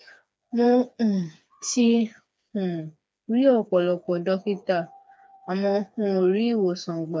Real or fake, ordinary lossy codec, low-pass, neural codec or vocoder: fake; none; none; codec, 16 kHz, 4 kbps, FreqCodec, smaller model